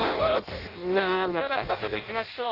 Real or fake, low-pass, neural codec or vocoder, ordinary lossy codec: fake; 5.4 kHz; codec, 16 kHz in and 24 kHz out, 0.6 kbps, FireRedTTS-2 codec; Opus, 32 kbps